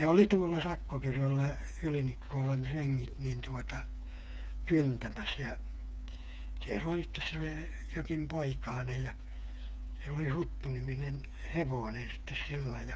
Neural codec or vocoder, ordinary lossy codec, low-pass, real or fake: codec, 16 kHz, 4 kbps, FreqCodec, smaller model; none; none; fake